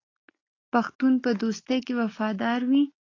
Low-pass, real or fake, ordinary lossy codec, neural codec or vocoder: 7.2 kHz; real; AAC, 32 kbps; none